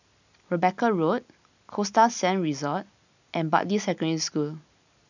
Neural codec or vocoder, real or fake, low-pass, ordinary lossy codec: none; real; 7.2 kHz; none